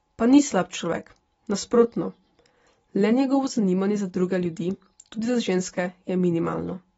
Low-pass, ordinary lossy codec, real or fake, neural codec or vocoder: 19.8 kHz; AAC, 24 kbps; real; none